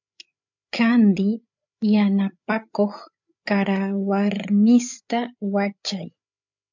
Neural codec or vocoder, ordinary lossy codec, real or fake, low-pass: codec, 16 kHz, 8 kbps, FreqCodec, larger model; MP3, 64 kbps; fake; 7.2 kHz